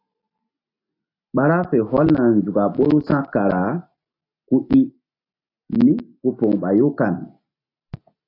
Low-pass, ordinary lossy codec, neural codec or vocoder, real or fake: 5.4 kHz; AAC, 32 kbps; none; real